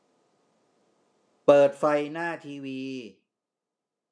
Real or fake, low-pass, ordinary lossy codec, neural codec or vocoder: real; none; none; none